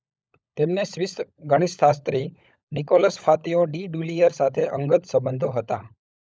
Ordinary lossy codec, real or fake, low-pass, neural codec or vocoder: none; fake; none; codec, 16 kHz, 16 kbps, FunCodec, trained on LibriTTS, 50 frames a second